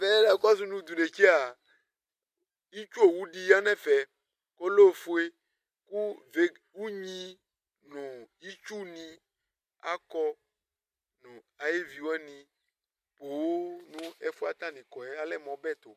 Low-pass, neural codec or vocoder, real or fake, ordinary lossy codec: 14.4 kHz; none; real; MP3, 64 kbps